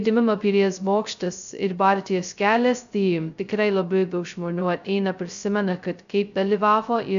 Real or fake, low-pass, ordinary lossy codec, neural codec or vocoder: fake; 7.2 kHz; AAC, 96 kbps; codec, 16 kHz, 0.2 kbps, FocalCodec